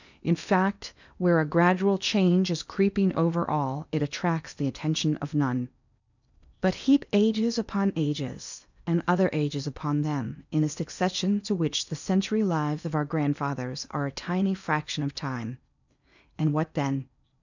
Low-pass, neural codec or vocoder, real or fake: 7.2 kHz; codec, 16 kHz in and 24 kHz out, 0.8 kbps, FocalCodec, streaming, 65536 codes; fake